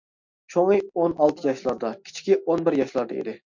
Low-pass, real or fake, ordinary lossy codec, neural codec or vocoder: 7.2 kHz; real; MP3, 64 kbps; none